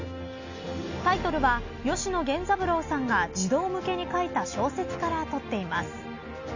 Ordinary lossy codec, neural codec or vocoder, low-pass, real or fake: none; none; 7.2 kHz; real